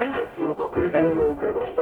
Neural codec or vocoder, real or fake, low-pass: codec, 44.1 kHz, 0.9 kbps, DAC; fake; 19.8 kHz